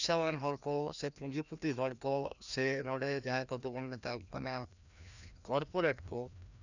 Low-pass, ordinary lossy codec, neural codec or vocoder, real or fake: 7.2 kHz; none; codec, 16 kHz, 1 kbps, FreqCodec, larger model; fake